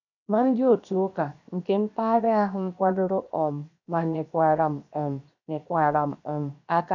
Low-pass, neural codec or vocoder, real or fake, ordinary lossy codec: 7.2 kHz; codec, 16 kHz, 0.7 kbps, FocalCodec; fake; none